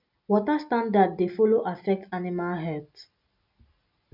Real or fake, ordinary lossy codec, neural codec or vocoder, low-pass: real; none; none; 5.4 kHz